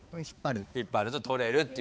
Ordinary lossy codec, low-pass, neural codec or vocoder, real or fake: none; none; codec, 16 kHz, 4 kbps, X-Codec, HuBERT features, trained on balanced general audio; fake